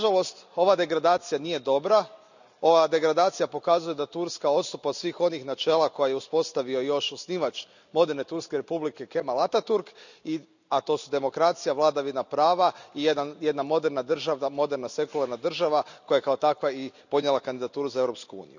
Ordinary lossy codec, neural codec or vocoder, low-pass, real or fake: none; none; 7.2 kHz; real